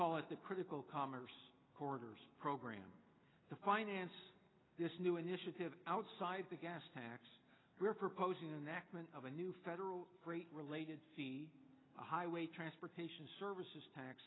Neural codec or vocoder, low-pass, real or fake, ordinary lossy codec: none; 7.2 kHz; real; AAC, 16 kbps